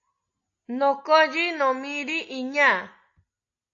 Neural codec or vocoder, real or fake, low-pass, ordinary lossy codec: none; real; 7.2 kHz; AAC, 48 kbps